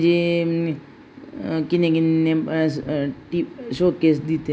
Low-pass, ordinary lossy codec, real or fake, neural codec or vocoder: none; none; real; none